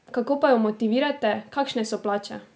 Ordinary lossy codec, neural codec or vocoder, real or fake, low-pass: none; none; real; none